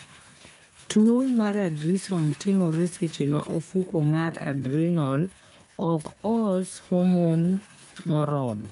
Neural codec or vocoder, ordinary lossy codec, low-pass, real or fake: codec, 24 kHz, 1 kbps, SNAC; none; 10.8 kHz; fake